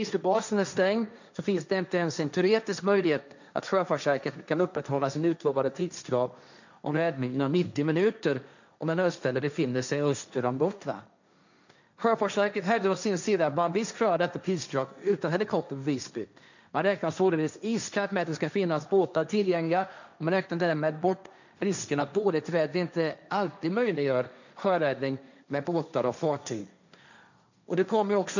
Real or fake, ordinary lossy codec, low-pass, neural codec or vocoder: fake; none; 7.2 kHz; codec, 16 kHz, 1.1 kbps, Voila-Tokenizer